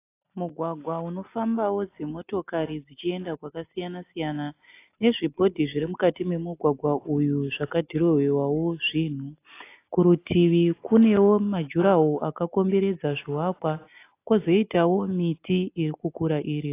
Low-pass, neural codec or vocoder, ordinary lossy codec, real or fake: 3.6 kHz; none; AAC, 24 kbps; real